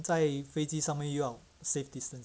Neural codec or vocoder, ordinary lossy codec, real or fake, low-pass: none; none; real; none